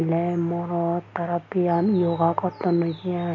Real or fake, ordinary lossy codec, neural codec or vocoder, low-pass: real; none; none; 7.2 kHz